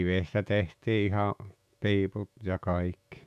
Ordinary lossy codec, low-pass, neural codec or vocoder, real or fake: none; 14.4 kHz; autoencoder, 48 kHz, 128 numbers a frame, DAC-VAE, trained on Japanese speech; fake